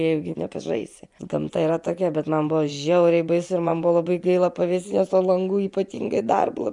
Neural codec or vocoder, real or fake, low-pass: vocoder, 24 kHz, 100 mel bands, Vocos; fake; 10.8 kHz